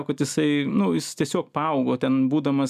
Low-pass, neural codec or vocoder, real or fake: 14.4 kHz; none; real